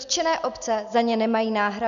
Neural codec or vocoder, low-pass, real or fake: none; 7.2 kHz; real